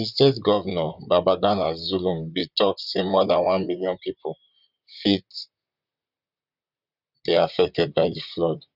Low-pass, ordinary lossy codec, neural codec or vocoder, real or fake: 5.4 kHz; none; vocoder, 44.1 kHz, 128 mel bands, Pupu-Vocoder; fake